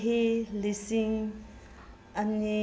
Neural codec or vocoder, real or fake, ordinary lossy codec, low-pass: none; real; none; none